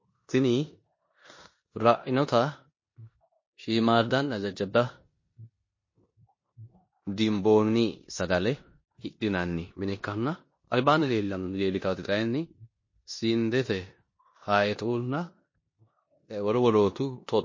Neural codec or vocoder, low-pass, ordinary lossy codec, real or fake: codec, 16 kHz in and 24 kHz out, 0.9 kbps, LongCat-Audio-Codec, four codebook decoder; 7.2 kHz; MP3, 32 kbps; fake